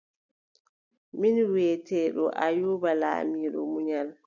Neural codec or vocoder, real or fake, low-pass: none; real; 7.2 kHz